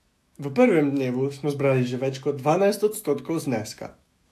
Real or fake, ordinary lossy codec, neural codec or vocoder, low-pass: fake; AAC, 64 kbps; autoencoder, 48 kHz, 128 numbers a frame, DAC-VAE, trained on Japanese speech; 14.4 kHz